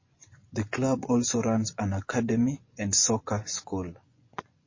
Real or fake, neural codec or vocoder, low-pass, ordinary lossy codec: fake; vocoder, 24 kHz, 100 mel bands, Vocos; 7.2 kHz; MP3, 32 kbps